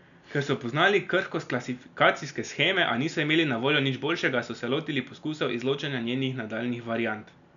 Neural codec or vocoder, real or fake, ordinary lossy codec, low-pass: none; real; MP3, 96 kbps; 7.2 kHz